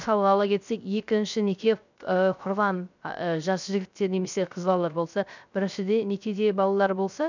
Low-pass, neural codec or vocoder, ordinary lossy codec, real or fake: 7.2 kHz; codec, 16 kHz, 0.3 kbps, FocalCodec; none; fake